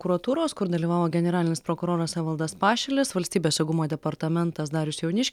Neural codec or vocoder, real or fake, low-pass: none; real; 19.8 kHz